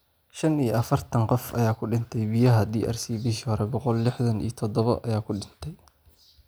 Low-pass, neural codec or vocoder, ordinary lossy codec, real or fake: none; none; none; real